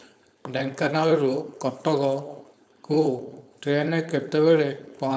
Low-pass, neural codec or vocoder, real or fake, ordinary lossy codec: none; codec, 16 kHz, 4.8 kbps, FACodec; fake; none